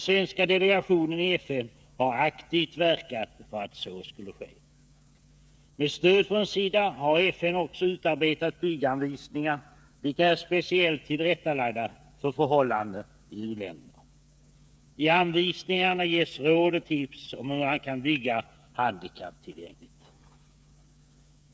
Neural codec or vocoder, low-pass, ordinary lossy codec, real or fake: codec, 16 kHz, 8 kbps, FreqCodec, smaller model; none; none; fake